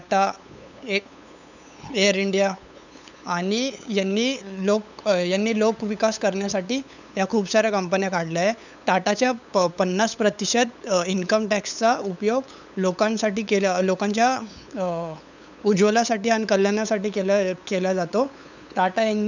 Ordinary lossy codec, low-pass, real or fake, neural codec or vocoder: none; 7.2 kHz; fake; codec, 16 kHz, 8 kbps, FunCodec, trained on LibriTTS, 25 frames a second